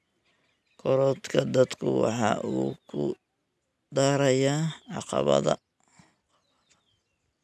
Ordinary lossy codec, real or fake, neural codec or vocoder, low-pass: none; real; none; none